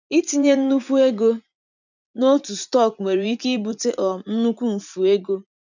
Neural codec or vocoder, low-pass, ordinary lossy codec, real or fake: vocoder, 44.1 kHz, 80 mel bands, Vocos; 7.2 kHz; none; fake